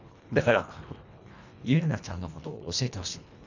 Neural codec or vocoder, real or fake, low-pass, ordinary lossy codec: codec, 24 kHz, 1.5 kbps, HILCodec; fake; 7.2 kHz; none